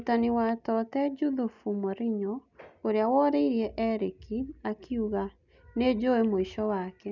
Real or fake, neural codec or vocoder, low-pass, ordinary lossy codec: real; none; 7.2 kHz; none